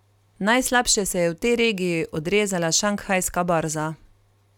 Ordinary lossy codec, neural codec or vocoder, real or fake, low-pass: none; none; real; 19.8 kHz